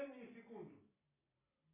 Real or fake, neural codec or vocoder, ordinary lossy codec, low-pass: real; none; AAC, 16 kbps; 3.6 kHz